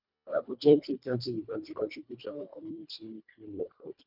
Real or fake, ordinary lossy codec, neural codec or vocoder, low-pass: fake; none; codec, 24 kHz, 1.5 kbps, HILCodec; 5.4 kHz